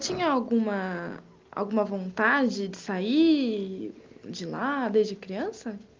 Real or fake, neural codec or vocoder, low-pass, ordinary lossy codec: real; none; 7.2 kHz; Opus, 32 kbps